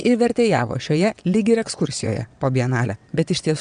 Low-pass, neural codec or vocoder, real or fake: 9.9 kHz; vocoder, 22.05 kHz, 80 mel bands, WaveNeXt; fake